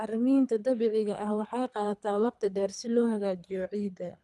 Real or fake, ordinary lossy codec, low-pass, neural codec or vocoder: fake; none; none; codec, 24 kHz, 3 kbps, HILCodec